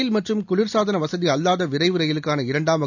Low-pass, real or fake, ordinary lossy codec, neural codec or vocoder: 7.2 kHz; real; none; none